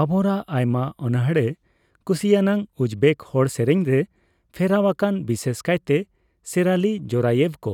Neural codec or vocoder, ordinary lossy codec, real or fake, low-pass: none; none; real; 19.8 kHz